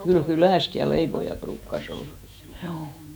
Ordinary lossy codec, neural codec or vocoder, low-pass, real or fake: none; autoencoder, 48 kHz, 128 numbers a frame, DAC-VAE, trained on Japanese speech; none; fake